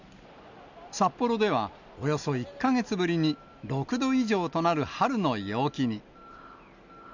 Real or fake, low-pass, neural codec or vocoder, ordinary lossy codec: real; 7.2 kHz; none; none